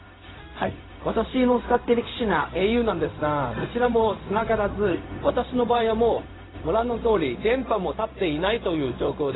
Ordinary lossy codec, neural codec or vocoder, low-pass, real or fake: AAC, 16 kbps; codec, 16 kHz, 0.4 kbps, LongCat-Audio-Codec; 7.2 kHz; fake